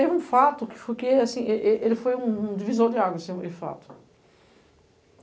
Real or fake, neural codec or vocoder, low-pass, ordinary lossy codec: real; none; none; none